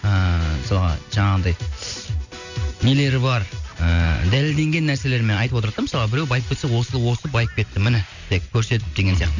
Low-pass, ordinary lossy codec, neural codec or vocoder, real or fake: 7.2 kHz; none; none; real